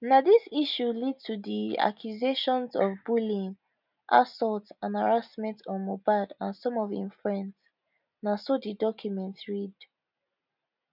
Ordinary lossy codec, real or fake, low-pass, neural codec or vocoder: none; real; 5.4 kHz; none